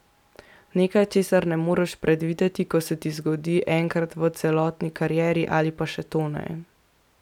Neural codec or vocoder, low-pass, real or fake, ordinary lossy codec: none; 19.8 kHz; real; none